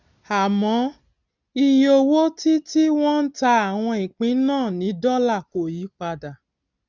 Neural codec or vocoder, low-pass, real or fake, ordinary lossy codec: none; 7.2 kHz; real; none